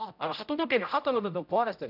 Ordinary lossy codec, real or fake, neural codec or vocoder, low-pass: none; fake; codec, 16 kHz, 0.5 kbps, X-Codec, HuBERT features, trained on general audio; 5.4 kHz